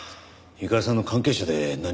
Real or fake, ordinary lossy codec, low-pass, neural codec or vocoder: real; none; none; none